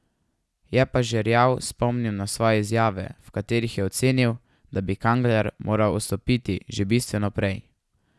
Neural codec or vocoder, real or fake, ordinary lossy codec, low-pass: none; real; none; none